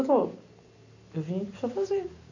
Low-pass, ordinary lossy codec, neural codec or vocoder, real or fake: 7.2 kHz; none; none; real